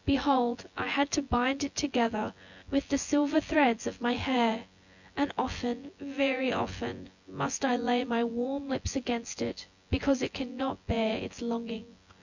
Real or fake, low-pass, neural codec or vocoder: fake; 7.2 kHz; vocoder, 24 kHz, 100 mel bands, Vocos